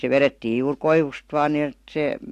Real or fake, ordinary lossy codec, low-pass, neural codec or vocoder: real; AAC, 64 kbps; 14.4 kHz; none